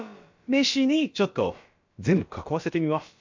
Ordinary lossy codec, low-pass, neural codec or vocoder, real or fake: MP3, 48 kbps; 7.2 kHz; codec, 16 kHz, about 1 kbps, DyCAST, with the encoder's durations; fake